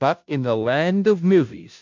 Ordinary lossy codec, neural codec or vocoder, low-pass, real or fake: MP3, 64 kbps; codec, 16 kHz, 0.5 kbps, FunCodec, trained on Chinese and English, 25 frames a second; 7.2 kHz; fake